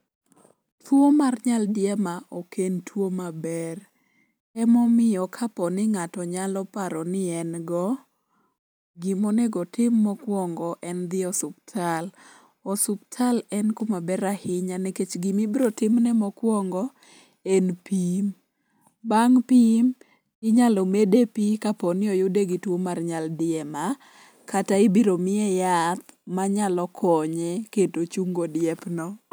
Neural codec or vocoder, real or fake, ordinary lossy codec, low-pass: none; real; none; none